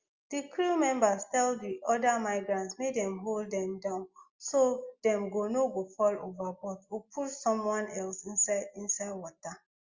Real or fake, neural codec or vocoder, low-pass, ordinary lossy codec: real; none; 7.2 kHz; Opus, 32 kbps